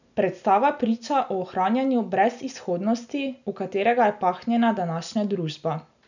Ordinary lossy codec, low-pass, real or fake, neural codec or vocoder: none; 7.2 kHz; real; none